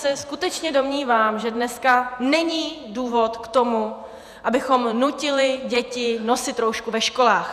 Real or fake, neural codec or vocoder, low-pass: fake; vocoder, 48 kHz, 128 mel bands, Vocos; 14.4 kHz